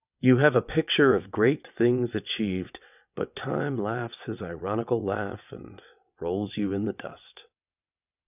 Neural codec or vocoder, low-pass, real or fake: vocoder, 22.05 kHz, 80 mel bands, WaveNeXt; 3.6 kHz; fake